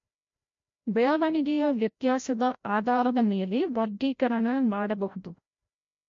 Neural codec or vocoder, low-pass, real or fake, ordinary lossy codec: codec, 16 kHz, 0.5 kbps, FreqCodec, larger model; 7.2 kHz; fake; MP3, 48 kbps